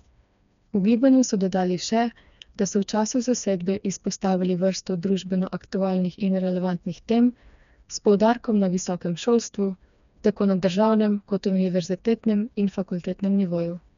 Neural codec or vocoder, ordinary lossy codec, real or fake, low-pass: codec, 16 kHz, 2 kbps, FreqCodec, smaller model; none; fake; 7.2 kHz